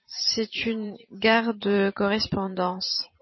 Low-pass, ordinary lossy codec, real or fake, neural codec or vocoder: 7.2 kHz; MP3, 24 kbps; fake; vocoder, 44.1 kHz, 128 mel bands every 512 samples, BigVGAN v2